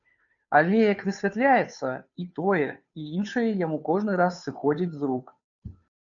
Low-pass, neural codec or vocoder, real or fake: 7.2 kHz; codec, 16 kHz, 2 kbps, FunCodec, trained on Chinese and English, 25 frames a second; fake